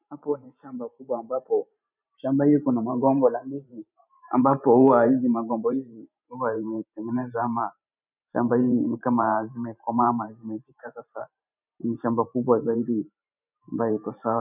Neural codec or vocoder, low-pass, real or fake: vocoder, 44.1 kHz, 128 mel bands every 512 samples, BigVGAN v2; 3.6 kHz; fake